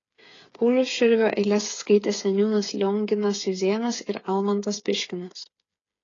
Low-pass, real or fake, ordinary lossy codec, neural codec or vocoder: 7.2 kHz; fake; AAC, 32 kbps; codec, 16 kHz, 8 kbps, FreqCodec, smaller model